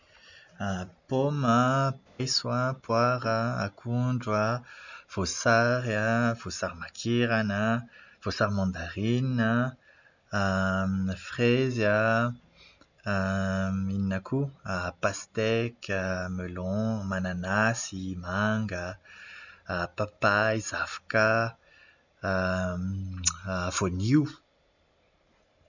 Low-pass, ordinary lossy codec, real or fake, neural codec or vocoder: 7.2 kHz; none; real; none